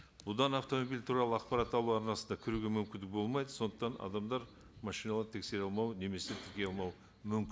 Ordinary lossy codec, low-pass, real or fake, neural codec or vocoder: none; none; real; none